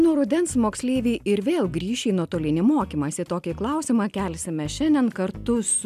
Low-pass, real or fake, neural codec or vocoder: 14.4 kHz; real; none